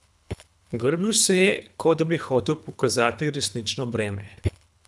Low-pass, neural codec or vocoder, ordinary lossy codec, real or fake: none; codec, 24 kHz, 3 kbps, HILCodec; none; fake